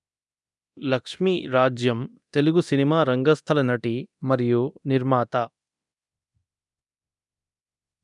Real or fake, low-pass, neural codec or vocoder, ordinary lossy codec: fake; 10.8 kHz; codec, 24 kHz, 0.9 kbps, DualCodec; none